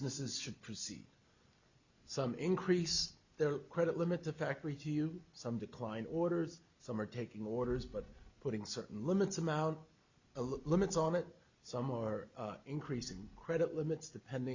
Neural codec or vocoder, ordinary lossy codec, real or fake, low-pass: none; Opus, 64 kbps; real; 7.2 kHz